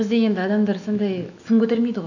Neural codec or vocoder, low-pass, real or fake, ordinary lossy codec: autoencoder, 48 kHz, 128 numbers a frame, DAC-VAE, trained on Japanese speech; 7.2 kHz; fake; none